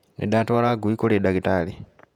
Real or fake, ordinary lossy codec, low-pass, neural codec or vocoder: fake; none; 19.8 kHz; vocoder, 48 kHz, 128 mel bands, Vocos